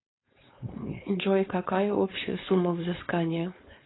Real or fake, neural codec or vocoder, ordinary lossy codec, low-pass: fake; codec, 16 kHz, 4.8 kbps, FACodec; AAC, 16 kbps; 7.2 kHz